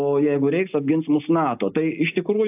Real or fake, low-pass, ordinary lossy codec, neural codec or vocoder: real; 3.6 kHz; AAC, 32 kbps; none